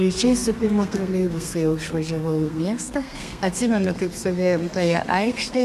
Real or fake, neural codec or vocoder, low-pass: fake; codec, 32 kHz, 1.9 kbps, SNAC; 14.4 kHz